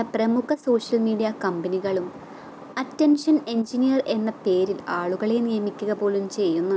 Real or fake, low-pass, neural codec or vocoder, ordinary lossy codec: real; none; none; none